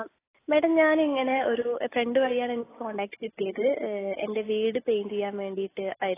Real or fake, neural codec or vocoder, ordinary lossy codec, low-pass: real; none; AAC, 16 kbps; 3.6 kHz